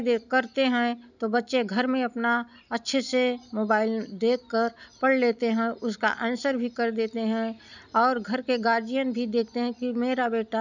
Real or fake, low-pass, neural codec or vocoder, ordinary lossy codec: real; 7.2 kHz; none; none